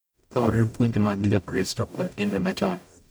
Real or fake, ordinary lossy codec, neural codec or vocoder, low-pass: fake; none; codec, 44.1 kHz, 0.9 kbps, DAC; none